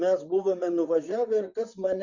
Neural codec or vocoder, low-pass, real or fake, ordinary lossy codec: vocoder, 44.1 kHz, 128 mel bands, Pupu-Vocoder; 7.2 kHz; fake; Opus, 64 kbps